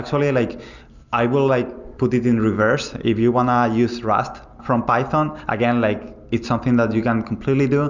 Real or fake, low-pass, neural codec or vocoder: real; 7.2 kHz; none